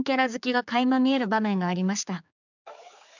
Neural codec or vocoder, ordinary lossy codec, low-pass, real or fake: codec, 16 kHz, 4 kbps, X-Codec, HuBERT features, trained on general audio; none; 7.2 kHz; fake